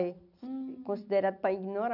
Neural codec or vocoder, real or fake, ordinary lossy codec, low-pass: none; real; none; 5.4 kHz